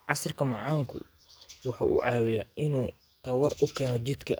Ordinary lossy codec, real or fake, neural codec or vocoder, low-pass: none; fake; codec, 44.1 kHz, 2.6 kbps, SNAC; none